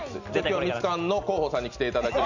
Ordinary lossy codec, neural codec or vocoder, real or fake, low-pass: none; none; real; 7.2 kHz